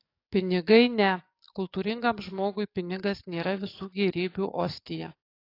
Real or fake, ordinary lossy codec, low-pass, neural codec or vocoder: fake; AAC, 32 kbps; 5.4 kHz; codec, 44.1 kHz, 7.8 kbps, DAC